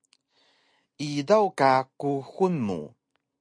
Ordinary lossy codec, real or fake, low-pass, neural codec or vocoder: MP3, 48 kbps; real; 9.9 kHz; none